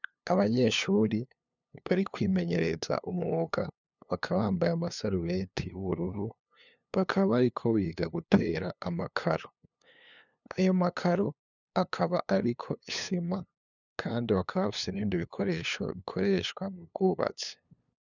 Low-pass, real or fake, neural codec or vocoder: 7.2 kHz; fake; codec, 16 kHz, 2 kbps, FunCodec, trained on LibriTTS, 25 frames a second